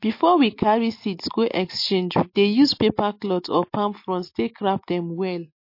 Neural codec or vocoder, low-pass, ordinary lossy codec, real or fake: none; 5.4 kHz; MP3, 32 kbps; real